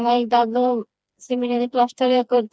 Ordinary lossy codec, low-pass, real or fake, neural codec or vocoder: none; none; fake; codec, 16 kHz, 2 kbps, FreqCodec, smaller model